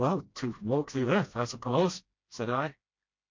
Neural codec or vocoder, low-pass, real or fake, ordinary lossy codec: codec, 16 kHz, 1 kbps, FreqCodec, smaller model; 7.2 kHz; fake; MP3, 48 kbps